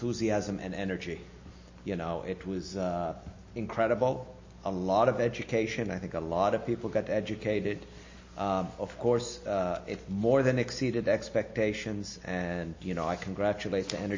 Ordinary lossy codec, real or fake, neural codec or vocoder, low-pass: MP3, 32 kbps; real; none; 7.2 kHz